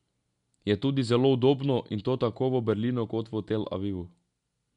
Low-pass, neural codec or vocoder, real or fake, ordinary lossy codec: 10.8 kHz; none; real; none